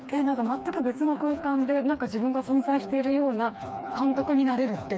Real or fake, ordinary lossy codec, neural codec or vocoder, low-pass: fake; none; codec, 16 kHz, 2 kbps, FreqCodec, smaller model; none